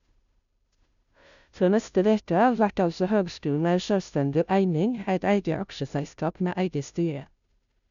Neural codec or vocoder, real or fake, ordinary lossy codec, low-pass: codec, 16 kHz, 0.5 kbps, FunCodec, trained on Chinese and English, 25 frames a second; fake; none; 7.2 kHz